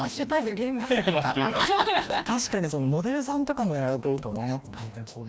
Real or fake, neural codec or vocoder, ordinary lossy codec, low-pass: fake; codec, 16 kHz, 1 kbps, FreqCodec, larger model; none; none